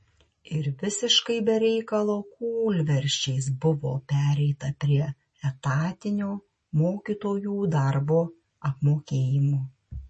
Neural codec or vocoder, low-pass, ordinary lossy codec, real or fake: none; 9.9 kHz; MP3, 32 kbps; real